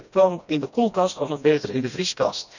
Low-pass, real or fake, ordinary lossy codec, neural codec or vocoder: 7.2 kHz; fake; none; codec, 16 kHz, 1 kbps, FreqCodec, smaller model